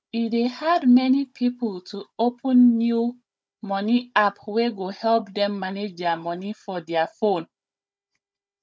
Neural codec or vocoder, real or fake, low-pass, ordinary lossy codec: codec, 16 kHz, 16 kbps, FunCodec, trained on Chinese and English, 50 frames a second; fake; none; none